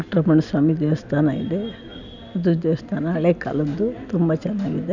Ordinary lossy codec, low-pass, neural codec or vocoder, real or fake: none; 7.2 kHz; none; real